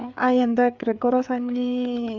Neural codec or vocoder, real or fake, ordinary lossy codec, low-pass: codec, 16 kHz, 4 kbps, FreqCodec, larger model; fake; none; 7.2 kHz